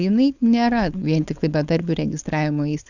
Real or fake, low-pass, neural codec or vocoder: fake; 7.2 kHz; codec, 16 kHz, 4.8 kbps, FACodec